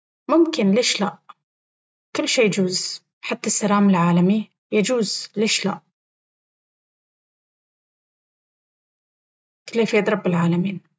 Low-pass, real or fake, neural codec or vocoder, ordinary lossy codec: none; real; none; none